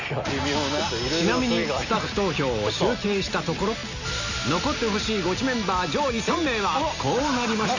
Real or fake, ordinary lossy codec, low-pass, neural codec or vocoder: real; none; 7.2 kHz; none